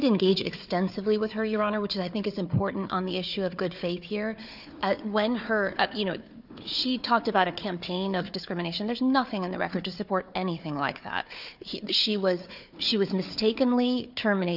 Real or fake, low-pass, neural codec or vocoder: fake; 5.4 kHz; codec, 16 kHz, 4 kbps, FunCodec, trained on Chinese and English, 50 frames a second